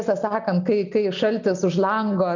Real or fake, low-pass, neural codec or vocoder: real; 7.2 kHz; none